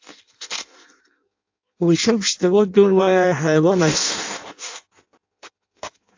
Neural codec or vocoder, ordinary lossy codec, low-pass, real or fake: codec, 16 kHz in and 24 kHz out, 0.6 kbps, FireRedTTS-2 codec; AAC, 48 kbps; 7.2 kHz; fake